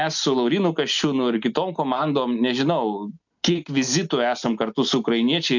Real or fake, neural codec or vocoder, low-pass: real; none; 7.2 kHz